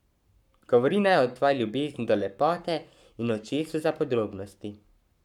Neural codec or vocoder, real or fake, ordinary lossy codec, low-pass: codec, 44.1 kHz, 7.8 kbps, Pupu-Codec; fake; none; 19.8 kHz